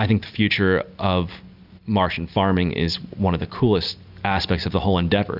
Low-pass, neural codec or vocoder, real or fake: 5.4 kHz; none; real